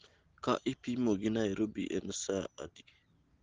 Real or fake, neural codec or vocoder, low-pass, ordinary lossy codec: real; none; 7.2 kHz; Opus, 16 kbps